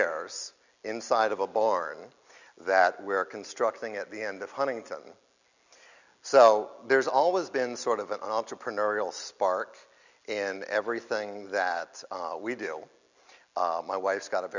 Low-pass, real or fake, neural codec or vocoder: 7.2 kHz; real; none